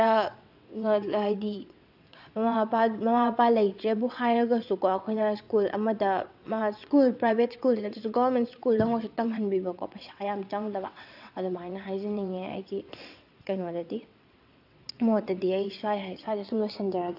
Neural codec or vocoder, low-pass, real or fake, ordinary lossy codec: vocoder, 22.05 kHz, 80 mel bands, WaveNeXt; 5.4 kHz; fake; AAC, 48 kbps